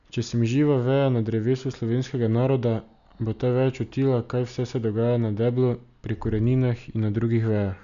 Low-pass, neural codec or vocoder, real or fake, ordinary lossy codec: 7.2 kHz; none; real; none